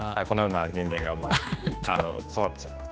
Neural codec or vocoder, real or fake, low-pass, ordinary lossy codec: codec, 16 kHz, 2 kbps, X-Codec, HuBERT features, trained on general audio; fake; none; none